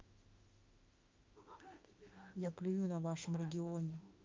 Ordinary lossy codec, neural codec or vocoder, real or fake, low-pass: Opus, 24 kbps; autoencoder, 48 kHz, 32 numbers a frame, DAC-VAE, trained on Japanese speech; fake; 7.2 kHz